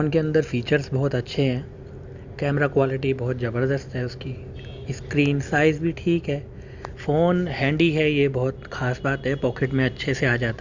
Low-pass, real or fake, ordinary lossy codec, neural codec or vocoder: 7.2 kHz; real; Opus, 64 kbps; none